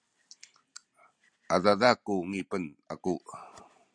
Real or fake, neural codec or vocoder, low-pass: real; none; 9.9 kHz